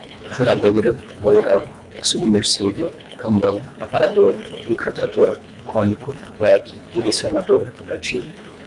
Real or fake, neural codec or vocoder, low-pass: fake; codec, 24 kHz, 1.5 kbps, HILCodec; 10.8 kHz